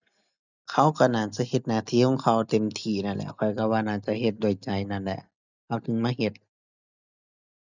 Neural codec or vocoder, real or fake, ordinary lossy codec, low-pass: none; real; none; 7.2 kHz